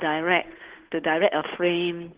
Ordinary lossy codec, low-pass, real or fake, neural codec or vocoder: Opus, 16 kbps; 3.6 kHz; fake; codec, 16 kHz, 8 kbps, FunCodec, trained on Chinese and English, 25 frames a second